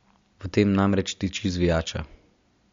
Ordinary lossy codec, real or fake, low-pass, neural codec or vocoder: MP3, 48 kbps; real; 7.2 kHz; none